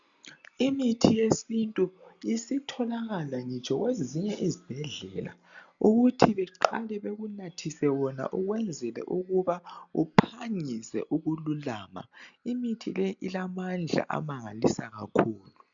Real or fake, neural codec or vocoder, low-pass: real; none; 7.2 kHz